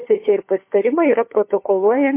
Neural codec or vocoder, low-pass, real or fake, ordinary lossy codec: codec, 16 kHz, 4 kbps, FunCodec, trained on LibriTTS, 50 frames a second; 3.6 kHz; fake; MP3, 24 kbps